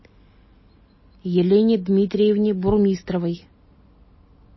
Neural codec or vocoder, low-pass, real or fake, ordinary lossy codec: none; 7.2 kHz; real; MP3, 24 kbps